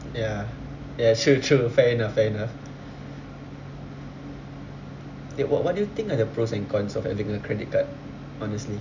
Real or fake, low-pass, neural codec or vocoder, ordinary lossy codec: real; 7.2 kHz; none; none